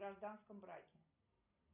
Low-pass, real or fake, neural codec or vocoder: 3.6 kHz; real; none